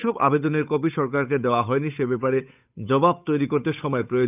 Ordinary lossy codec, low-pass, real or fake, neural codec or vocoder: none; 3.6 kHz; fake; codec, 16 kHz, 8 kbps, FunCodec, trained on Chinese and English, 25 frames a second